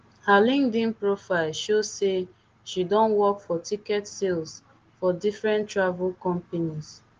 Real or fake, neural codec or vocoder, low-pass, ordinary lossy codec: real; none; 7.2 kHz; Opus, 16 kbps